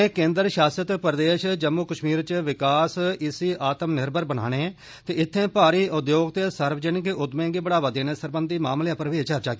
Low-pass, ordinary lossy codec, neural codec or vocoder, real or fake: none; none; none; real